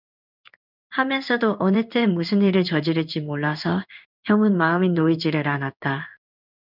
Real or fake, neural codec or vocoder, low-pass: fake; codec, 16 kHz in and 24 kHz out, 1 kbps, XY-Tokenizer; 5.4 kHz